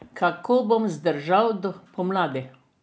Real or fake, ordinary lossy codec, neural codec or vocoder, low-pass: real; none; none; none